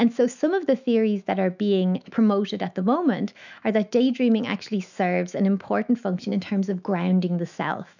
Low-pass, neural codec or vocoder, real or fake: 7.2 kHz; autoencoder, 48 kHz, 128 numbers a frame, DAC-VAE, trained on Japanese speech; fake